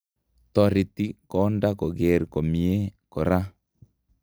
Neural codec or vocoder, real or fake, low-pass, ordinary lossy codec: none; real; none; none